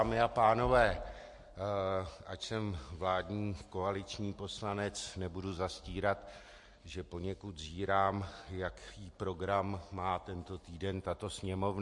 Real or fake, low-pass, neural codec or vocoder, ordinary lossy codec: real; 10.8 kHz; none; MP3, 48 kbps